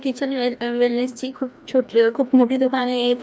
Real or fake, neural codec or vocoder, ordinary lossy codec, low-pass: fake; codec, 16 kHz, 1 kbps, FreqCodec, larger model; none; none